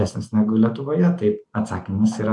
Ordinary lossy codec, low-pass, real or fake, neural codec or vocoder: MP3, 96 kbps; 10.8 kHz; real; none